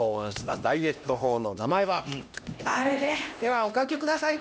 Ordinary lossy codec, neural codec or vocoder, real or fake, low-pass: none; codec, 16 kHz, 1 kbps, X-Codec, HuBERT features, trained on LibriSpeech; fake; none